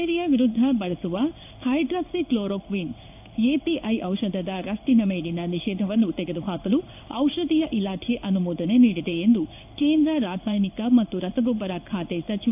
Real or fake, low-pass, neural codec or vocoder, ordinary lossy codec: fake; 3.6 kHz; codec, 16 kHz in and 24 kHz out, 1 kbps, XY-Tokenizer; AAC, 32 kbps